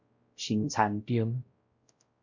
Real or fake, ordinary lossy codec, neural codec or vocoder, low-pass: fake; Opus, 64 kbps; codec, 16 kHz, 0.5 kbps, X-Codec, WavLM features, trained on Multilingual LibriSpeech; 7.2 kHz